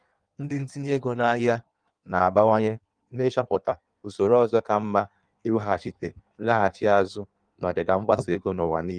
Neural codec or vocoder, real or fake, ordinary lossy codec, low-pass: codec, 16 kHz in and 24 kHz out, 1.1 kbps, FireRedTTS-2 codec; fake; Opus, 32 kbps; 9.9 kHz